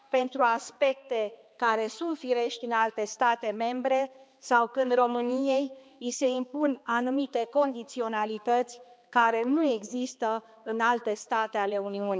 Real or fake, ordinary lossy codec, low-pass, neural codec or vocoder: fake; none; none; codec, 16 kHz, 2 kbps, X-Codec, HuBERT features, trained on balanced general audio